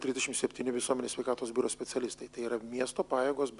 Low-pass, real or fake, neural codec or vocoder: 10.8 kHz; real; none